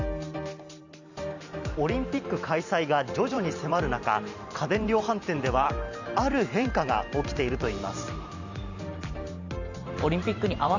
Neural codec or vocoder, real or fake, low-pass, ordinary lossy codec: vocoder, 44.1 kHz, 128 mel bands every 512 samples, BigVGAN v2; fake; 7.2 kHz; none